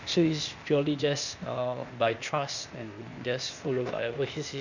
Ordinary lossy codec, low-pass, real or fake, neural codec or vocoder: none; 7.2 kHz; fake; codec, 16 kHz, 0.8 kbps, ZipCodec